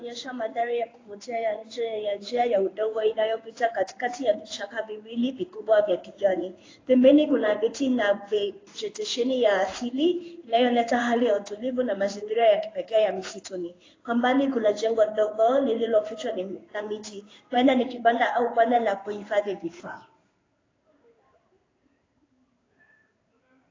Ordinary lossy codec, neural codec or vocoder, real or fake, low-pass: AAC, 32 kbps; codec, 16 kHz in and 24 kHz out, 1 kbps, XY-Tokenizer; fake; 7.2 kHz